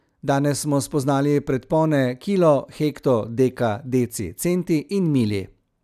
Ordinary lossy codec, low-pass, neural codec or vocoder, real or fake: none; 14.4 kHz; none; real